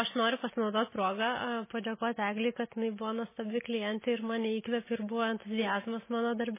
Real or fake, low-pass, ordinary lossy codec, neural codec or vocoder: real; 3.6 kHz; MP3, 16 kbps; none